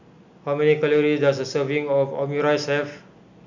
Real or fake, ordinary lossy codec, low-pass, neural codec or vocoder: real; none; 7.2 kHz; none